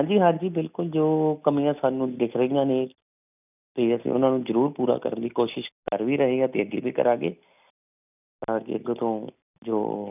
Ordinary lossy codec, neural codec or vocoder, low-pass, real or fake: none; none; 3.6 kHz; real